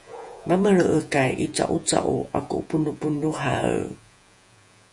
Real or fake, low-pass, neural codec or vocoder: fake; 10.8 kHz; vocoder, 48 kHz, 128 mel bands, Vocos